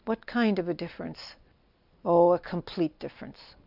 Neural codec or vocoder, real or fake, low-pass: none; real; 5.4 kHz